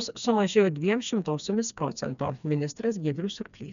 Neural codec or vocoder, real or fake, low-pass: codec, 16 kHz, 2 kbps, FreqCodec, smaller model; fake; 7.2 kHz